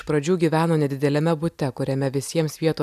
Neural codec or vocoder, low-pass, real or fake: none; 14.4 kHz; real